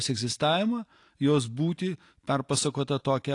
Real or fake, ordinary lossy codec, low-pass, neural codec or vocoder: real; AAC, 48 kbps; 10.8 kHz; none